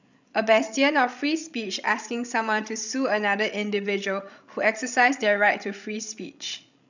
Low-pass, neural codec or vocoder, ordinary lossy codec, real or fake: 7.2 kHz; codec, 16 kHz, 16 kbps, FreqCodec, larger model; none; fake